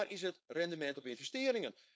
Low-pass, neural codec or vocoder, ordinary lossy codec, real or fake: none; codec, 16 kHz, 4.8 kbps, FACodec; none; fake